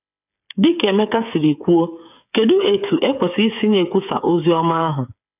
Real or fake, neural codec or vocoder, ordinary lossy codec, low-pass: fake; codec, 16 kHz, 8 kbps, FreqCodec, smaller model; none; 3.6 kHz